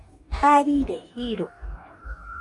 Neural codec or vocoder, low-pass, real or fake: codec, 44.1 kHz, 2.6 kbps, DAC; 10.8 kHz; fake